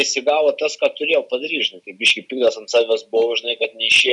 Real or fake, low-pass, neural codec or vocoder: real; 10.8 kHz; none